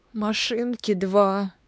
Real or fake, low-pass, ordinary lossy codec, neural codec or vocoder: fake; none; none; codec, 16 kHz, 2 kbps, X-Codec, WavLM features, trained on Multilingual LibriSpeech